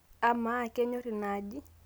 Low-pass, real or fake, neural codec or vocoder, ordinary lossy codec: none; real; none; none